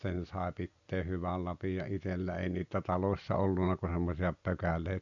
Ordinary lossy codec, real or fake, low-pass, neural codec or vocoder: none; real; 7.2 kHz; none